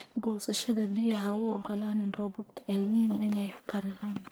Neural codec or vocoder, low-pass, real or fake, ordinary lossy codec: codec, 44.1 kHz, 1.7 kbps, Pupu-Codec; none; fake; none